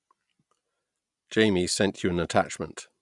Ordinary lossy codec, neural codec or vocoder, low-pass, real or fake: none; none; 10.8 kHz; real